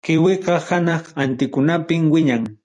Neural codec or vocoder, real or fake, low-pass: vocoder, 44.1 kHz, 128 mel bands every 256 samples, BigVGAN v2; fake; 10.8 kHz